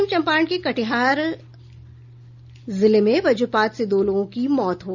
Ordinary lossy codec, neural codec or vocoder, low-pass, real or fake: none; none; 7.2 kHz; real